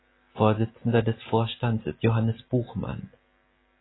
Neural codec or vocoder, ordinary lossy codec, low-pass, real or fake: none; AAC, 16 kbps; 7.2 kHz; real